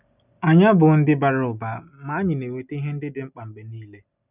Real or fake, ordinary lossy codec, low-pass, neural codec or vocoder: real; none; 3.6 kHz; none